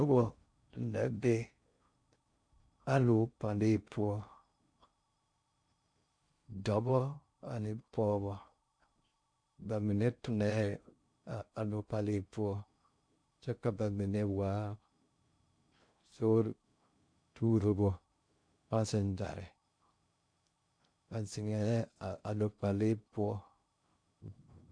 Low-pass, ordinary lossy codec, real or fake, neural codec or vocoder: 9.9 kHz; MP3, 64 kbps; fake; codec, 16 kHz in and 24 kHz out, 0.6 kbps, FocalCodec, streaming, 2048 codes